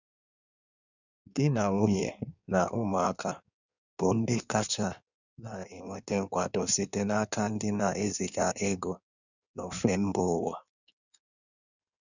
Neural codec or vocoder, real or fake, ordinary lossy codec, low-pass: codec, 16 kHz in and 24 kHz out, 1.1 kbps, FireRedTTS-2 codec; fake; none; 7.2 kHz